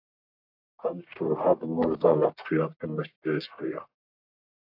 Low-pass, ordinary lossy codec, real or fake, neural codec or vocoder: 5.4 kHz; AAC, 48 kbps; fake; codec, 44.1 kHz, 1.7 kbps, Pupu-Codec